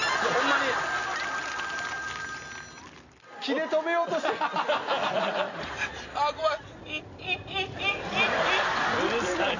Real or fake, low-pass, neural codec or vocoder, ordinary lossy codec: real; 7.2 kHz; none; none